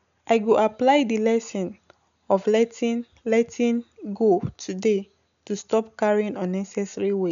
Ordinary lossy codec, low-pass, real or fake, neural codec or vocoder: none; 7.2 kHz; real; none